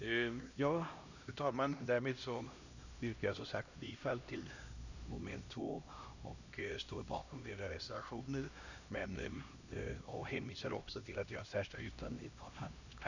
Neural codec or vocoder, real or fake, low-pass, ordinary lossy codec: codec, 16 kHz, 1 kbps, X-Codec, HuBERT features, trained on LibriSpeech; fake; 7.2 kHz; none